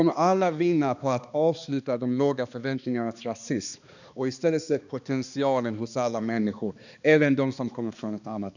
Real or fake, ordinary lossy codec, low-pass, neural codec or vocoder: fake; none; 7.2 kHz; codec, 16 kHz, 2 kbps, X-Codec, HuBERT features, trained on balanced general audio